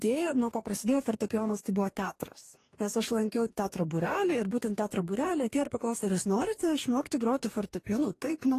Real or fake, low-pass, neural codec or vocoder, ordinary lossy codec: fake; 14.4 kHz; codec, 44.1 kHz, 2.6 kbps, DAC; AAC, 48 kbps